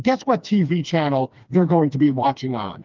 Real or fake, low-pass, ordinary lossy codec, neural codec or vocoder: fake; 7.2 kHz; Opus, 24 kbps; codec, 16 kHz, 2 kbps, FreqCodec, smaller model